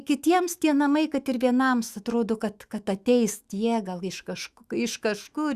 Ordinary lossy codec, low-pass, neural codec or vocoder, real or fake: Opus, 64 kbps; 14.4 kHz; autoencoder, 48 kHz, 128 numbers a frame, DAC-VAE, trained on Japanese speech; fake